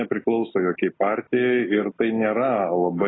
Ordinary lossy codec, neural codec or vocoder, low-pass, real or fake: AAC, 16 kbps; none; 7.2 kHz; real